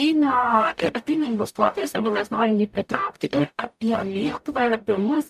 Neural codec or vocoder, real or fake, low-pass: codec, 44.1 kHz, 0.9 kbps, DAC; fake; 14.4 kHz